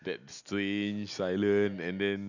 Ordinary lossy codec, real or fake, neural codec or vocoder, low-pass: none; real; none; 7.2 kHz